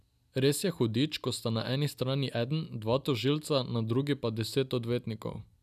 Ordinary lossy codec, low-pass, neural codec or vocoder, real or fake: none; 14.4 kHz; none; real